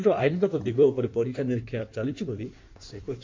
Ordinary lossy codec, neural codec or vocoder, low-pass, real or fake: MP3, 48 kbps; codec, 16 kHz in and 24 kHz out, 1.1 kbps, FireRedTTS-2 codec; 7.2 kHz; fake